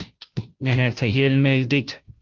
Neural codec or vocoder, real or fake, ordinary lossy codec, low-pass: codec, 16 kHz, 0.5 kbps, FunCodec, trained on Chinese and English, 25 frames a second; fake; Opus, 32 kbps; 7.2 kHz